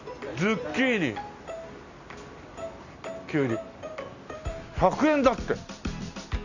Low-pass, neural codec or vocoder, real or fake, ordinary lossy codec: 7.2 kHz; none; real; none